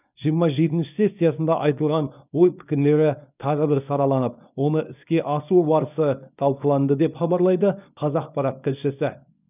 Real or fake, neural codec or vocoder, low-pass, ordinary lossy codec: fake; codec, 24 kHz, 0.9 kbps, WavTokenizer, small release; 3.6 kHz; none